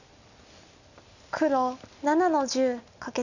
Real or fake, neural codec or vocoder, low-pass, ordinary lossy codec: real; none; 7.2 kHz; none